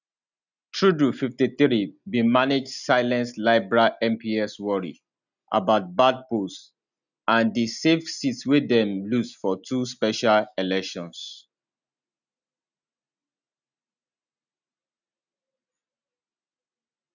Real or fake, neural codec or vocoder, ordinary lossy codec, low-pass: real; none; none; 7.2 kHz